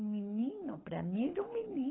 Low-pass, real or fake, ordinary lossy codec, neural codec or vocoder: 7.2 kHz; fake; AAC, 16 kbps; codec, 24 kHz, 6 kbps, HILCodec